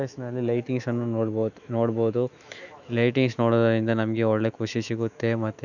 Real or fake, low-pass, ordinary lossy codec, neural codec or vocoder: fake; 7.2 kHz; none; autoencoder, 48 kHz, 128 numbers a frame, DAC-VAE, trained on Japanese speech